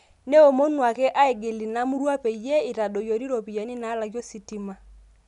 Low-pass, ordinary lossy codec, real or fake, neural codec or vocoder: 10.8 kHz; none; real; none